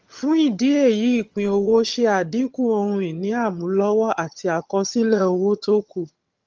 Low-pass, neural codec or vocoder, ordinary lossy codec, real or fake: 7.2 kHz; vocoder, 22.05 kHz, 80 mel bands, HiFi-GAN; Opus, 24 kbps; fake